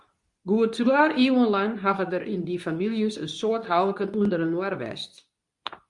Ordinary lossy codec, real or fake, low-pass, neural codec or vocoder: Opus, 64 kbps; fake; 10.8 kHz; codec, 24 kHz, 0.9 kbps, WavTokenizer, medium speech release version 2